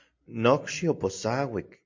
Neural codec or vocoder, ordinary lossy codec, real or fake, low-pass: none; MP3, 48 kbps; real; 7.2 kHz